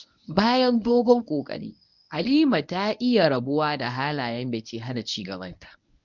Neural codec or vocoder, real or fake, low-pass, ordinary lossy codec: codec, 24 kHz, 0.9 kbps, WavTokenizer, medium speech release version 1; fake; 7.2 kHz; none